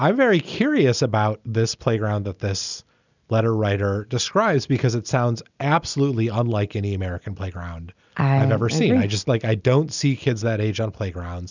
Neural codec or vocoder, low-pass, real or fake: none; 7.2 kHz; real